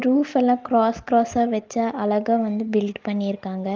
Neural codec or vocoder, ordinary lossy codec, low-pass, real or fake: none; Opus, 32 kbps; 7.2 kHz; real